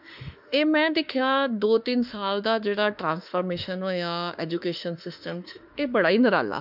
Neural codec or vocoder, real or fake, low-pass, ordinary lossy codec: autoencoder, 48 kHz, 32 numbers a frame, DAC-VAE, trained on Japanese speech; fake; 5.4 kHz; none